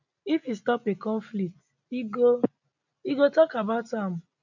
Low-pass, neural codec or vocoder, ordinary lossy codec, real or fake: 7.2 kHz; none; none; real